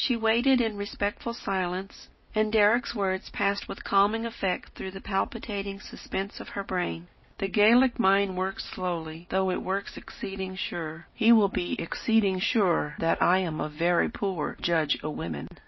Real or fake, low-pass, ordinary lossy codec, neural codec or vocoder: real; 7.2 kHz; MP3, 24 kbps; none